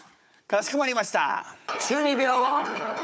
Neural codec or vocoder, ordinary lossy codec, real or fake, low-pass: codec, 16 kHz, 4 kbps, FunCodec, trained on Chinese and English, 50 frames a second; none; fake; none